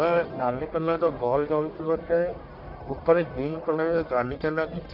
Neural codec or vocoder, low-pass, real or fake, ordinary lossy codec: codec, 44.1 kHz, 1.7 kbps, Pupu-Codec; 5.4 kHz; fake; none